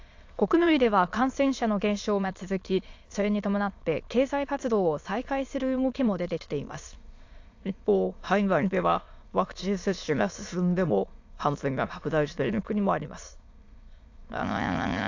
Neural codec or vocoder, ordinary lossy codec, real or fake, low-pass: autoencoder, 22.05 kHz, a latent of 192 numbers a frame, VITS, trained on many speakers; AAC, 48 kbps; fake; 7.2 kHz